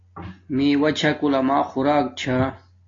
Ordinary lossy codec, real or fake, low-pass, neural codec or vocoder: AAC, 32 kbps; real; 7.2 kHz; none